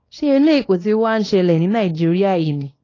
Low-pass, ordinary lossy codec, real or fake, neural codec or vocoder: 7.2 kHz; AAC, 32 kbps; fake; codec, 24 kHz, 0.9 kbps, WavTokenizer, small release